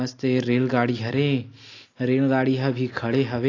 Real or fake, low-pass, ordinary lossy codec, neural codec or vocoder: real; 7.2 kHz; AAC, 32 kbps; none